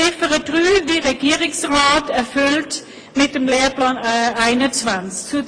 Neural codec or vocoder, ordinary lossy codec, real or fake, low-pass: vocoder, 24 kHz, 100 mel bands, Vocos; AAC, 32 kbps; fake; 9.9 kHz